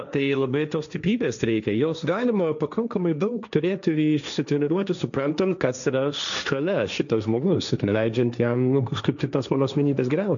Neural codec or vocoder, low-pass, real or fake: codec, 16 kHz, 1.1 kbps, Voila-Tokenizer; 7.2 kHz; fake